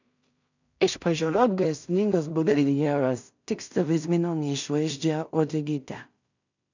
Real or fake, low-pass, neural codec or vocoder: fake; 7.2 kHz; codec, 16 kHz in and 24 kHz out, 0.4 kbps, LongCat-Audio-Codec, two codebook decoder